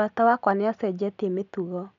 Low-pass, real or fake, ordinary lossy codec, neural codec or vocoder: 7.2 kHz; real; none; none